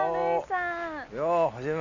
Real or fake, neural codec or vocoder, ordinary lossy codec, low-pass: real; none; none; 7.2 kHz